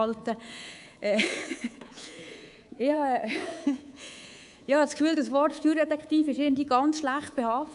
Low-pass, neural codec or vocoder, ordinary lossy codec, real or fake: 10.8 kHz; codec, 24 kHz, 3.1 kbps, DualCodec; none; fake